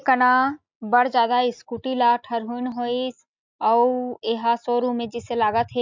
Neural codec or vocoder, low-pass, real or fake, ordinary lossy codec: none; 7.2 kHz; real; MP3, 64 kbps